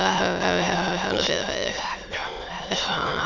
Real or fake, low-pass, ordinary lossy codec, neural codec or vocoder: fake; 7.2 kHz; none; autoencoder, 22.05 kHz, a latent of 192 numbers a frame, VITS, trained on many speakers